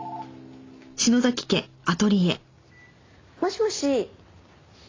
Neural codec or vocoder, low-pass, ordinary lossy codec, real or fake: none; 7.2 kHz; AAC, 32 kbps; real